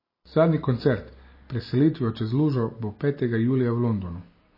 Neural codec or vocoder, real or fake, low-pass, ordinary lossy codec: none; real; 5.4 kHz; MP3, 24 kbps